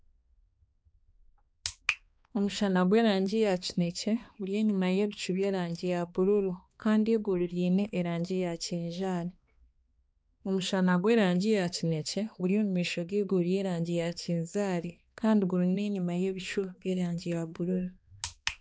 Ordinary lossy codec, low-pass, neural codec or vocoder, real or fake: none; none; codec, 16 kHz, 2 kbps, X-Codec, HuBERT features, trained on balanced general audio; fake